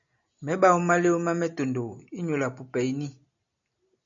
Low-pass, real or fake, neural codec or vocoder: 7.2 kHz; real; none